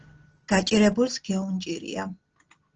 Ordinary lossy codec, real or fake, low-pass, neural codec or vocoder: Opus, 16 kbps; real; 7.2 kHz; none